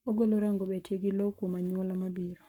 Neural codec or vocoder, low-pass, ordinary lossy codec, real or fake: codec, 44.1 kHz, 7.8 kbps, Pupu-Codec; 19.8 kHz; none; fake